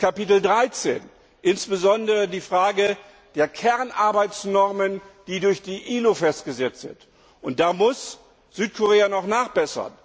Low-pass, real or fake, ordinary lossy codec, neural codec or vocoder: none; real; none; none